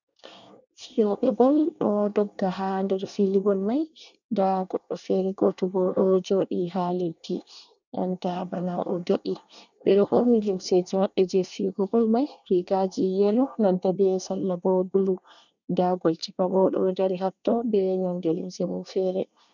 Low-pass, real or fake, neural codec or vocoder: 7.2 kHz; fake; codec, 24 kHz, 1 kbps, SNAC